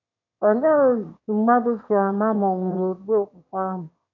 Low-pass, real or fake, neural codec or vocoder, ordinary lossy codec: 7.2 kHz; fake; autoencoder, 22.05 kHz, a latent of 192 numbers a frame, VITS, trained on one speaker; none